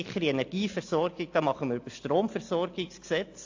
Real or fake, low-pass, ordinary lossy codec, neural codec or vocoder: real; 7.2 kHz; AAC, 48 kbps; none